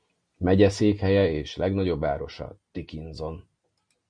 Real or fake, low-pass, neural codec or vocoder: real; 9.9 kHz; none